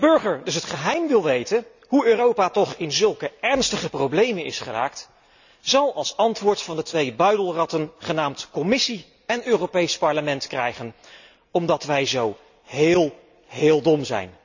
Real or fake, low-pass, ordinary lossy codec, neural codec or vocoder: real; 7.2 kHz; none; none